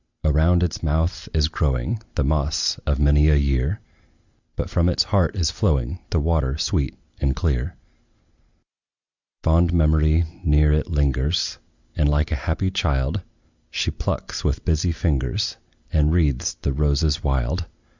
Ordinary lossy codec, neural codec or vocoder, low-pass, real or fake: Opus, 64 kbps; none; 7.2 kHz; real